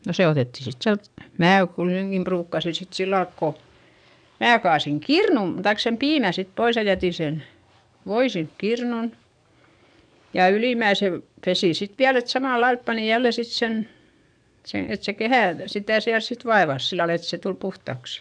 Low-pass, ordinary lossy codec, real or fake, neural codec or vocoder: 9.9 kHz; none; fake; codec, 44.1 kHz, 7.8 kbps, DAC